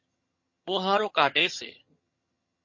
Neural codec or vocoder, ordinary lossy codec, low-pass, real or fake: vocoder, 22.05 kHz, 80 mel bands, HiFi-GAN; MP3, 32 kbps; 7.2 kHz; fake